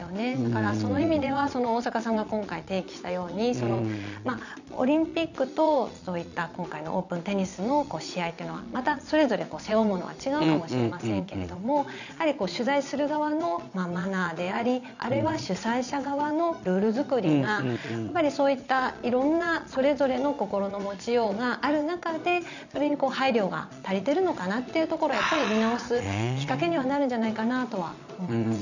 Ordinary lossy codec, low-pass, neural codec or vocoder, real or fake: none; 7.2 kHz; vocoder, 22.05 kHz, 80 mel bands, Vocos; fake